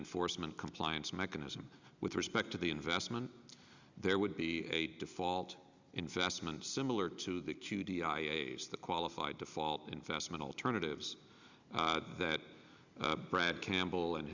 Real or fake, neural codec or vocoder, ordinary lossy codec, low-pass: real; none; Opus, 64 kbps; 7.2 kHz